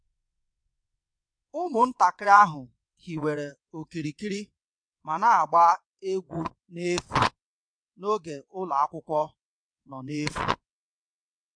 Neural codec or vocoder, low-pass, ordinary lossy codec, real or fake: vocoder, 22.05 kHz, 80 mel bands, WaveNeXt; 9.9 kHz; MP3, 64 kbps; fake